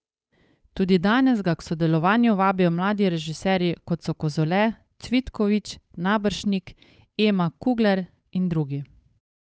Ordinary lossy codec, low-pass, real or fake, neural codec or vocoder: none; none; fake; codec, 16 kHz, 8 kbps, FunCodec, trained on Chinese and English, 25 frames a second